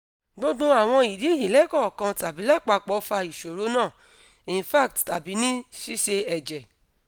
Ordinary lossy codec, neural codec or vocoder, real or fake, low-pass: none; none; real; none